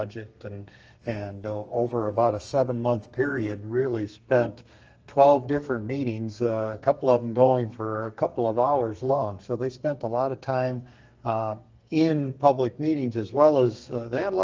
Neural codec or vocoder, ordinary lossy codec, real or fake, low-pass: codec, 32 kHz, 1.9 kbps, SNAC; Opus, 24 kbps; fake; 7.2 kHz